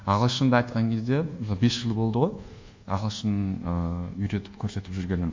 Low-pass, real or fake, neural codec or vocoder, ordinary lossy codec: 7.2 kHz; fake; codec, 24 kHz, 1.2 kbps, DualCodec; MP3, 48 kbps